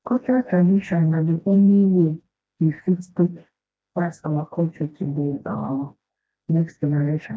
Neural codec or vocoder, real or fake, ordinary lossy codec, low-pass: codec, 16 kHz, 1 kbps, FreqCodec, smaller model; fake; none; none